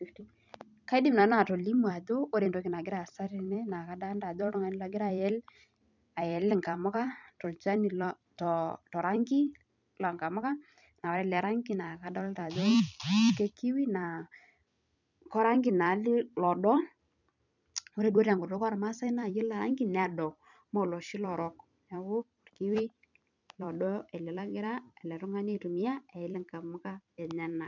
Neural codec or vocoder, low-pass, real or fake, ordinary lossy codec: vocoder, 44.1 kHz, 128 mel bands every 256 samples, BigVGAN v2; 7.2 kHz; fake; none